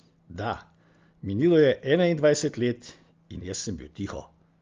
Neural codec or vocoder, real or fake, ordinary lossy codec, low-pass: none; real; Opus, 32 kbps; 7.2 kHz